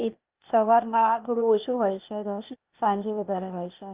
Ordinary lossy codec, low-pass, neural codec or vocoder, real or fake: Opus, 24 kbps; 3.6 kHz; codec, 16 kHz, 0.8 kbps, ZipCodec; fake